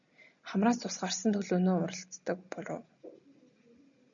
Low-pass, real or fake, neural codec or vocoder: 7.2 kHz; real; none